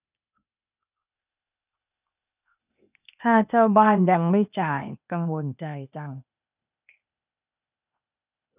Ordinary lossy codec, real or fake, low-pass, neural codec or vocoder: none; fake; 3.6 kHz; codec, 16 kHz, 0.8 kbps, ZipCodec